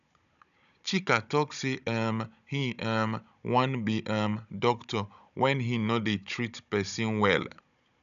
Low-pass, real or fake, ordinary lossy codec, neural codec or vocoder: 7.2 kHz; fake; none; codec, 16 kHz, 16 kbps, FunCodec, trained on Chinese and English, 50 frames a second